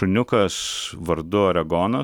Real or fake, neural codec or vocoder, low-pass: real; none; 19.8 kHz